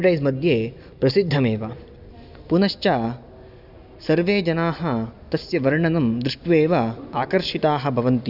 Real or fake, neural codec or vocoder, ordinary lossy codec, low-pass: real; none; none; 5.4 kHz